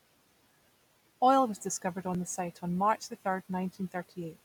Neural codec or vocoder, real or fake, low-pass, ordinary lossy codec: none; real; 19.8 kHz; none